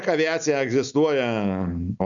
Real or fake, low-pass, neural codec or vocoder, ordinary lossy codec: real; 7.2 kHz; none; MP3, 96 kbps